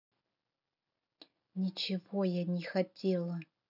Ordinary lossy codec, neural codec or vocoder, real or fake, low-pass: none; none; real; 5.4 kHz